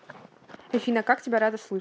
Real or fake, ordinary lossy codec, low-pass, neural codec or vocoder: real; none; none; none